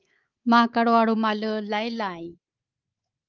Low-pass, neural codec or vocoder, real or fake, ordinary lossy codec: 7.2 kHz; codec, 24 kHz, 3.1 kbps, DualCodec; fake; Opus, 24 kbps